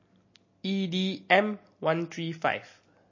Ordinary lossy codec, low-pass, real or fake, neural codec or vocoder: MP3, 32 kbps; 7.2 kHz; real; none